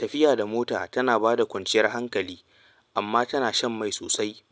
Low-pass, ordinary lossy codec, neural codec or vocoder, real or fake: none; none; none; real